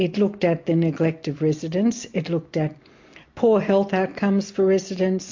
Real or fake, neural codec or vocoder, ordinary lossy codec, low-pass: real; none; MP3, 48 kbps; 7.2 kHz